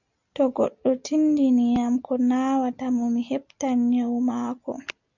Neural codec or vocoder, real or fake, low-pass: none; real; 7.2 kHz